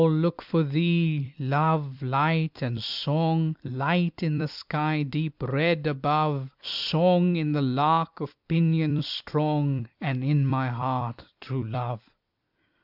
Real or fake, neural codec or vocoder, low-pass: fake; vocoder, 44.1 kHz, 128 mel bands every 256 samples, BigVGAN v2; 5.4 kHz